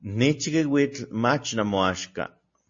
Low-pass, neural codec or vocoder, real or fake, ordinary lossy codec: 7.2 kHz; none; real; MP3, 32 kbps